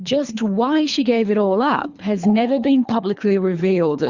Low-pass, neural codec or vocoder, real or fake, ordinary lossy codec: 7.2 kHz; codec, 24 kHz, 3 kbps, HILCodec; fake; Opus, 64 kbps